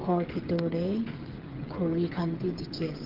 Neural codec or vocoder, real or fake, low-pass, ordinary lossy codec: codec, 16 kHz, 16 kbps, FreqCodec, smaller model; fake; 5.4 kHz; Opus, 16 kbps